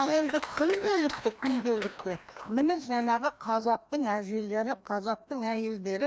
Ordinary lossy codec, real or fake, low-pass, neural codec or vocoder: none; fake; none; codec, 16 kHz, 1 kbps, FreqCodec, larger model